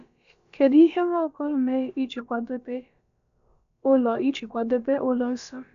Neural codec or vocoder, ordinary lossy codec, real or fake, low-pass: codec, 16 kHz, about 1 kbps, DyCAST, with the encoder's durations; none; fake; 7.2 kHz